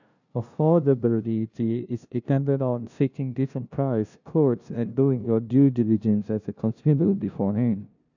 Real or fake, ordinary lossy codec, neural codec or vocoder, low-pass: fake; none; codec, 16 kHz, 0.5 kbps, FunCodec, trained on LibriTTS, 25 frames a second; 7.2 kHz